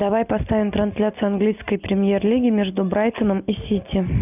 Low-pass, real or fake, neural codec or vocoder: 3.6 kHz; real; none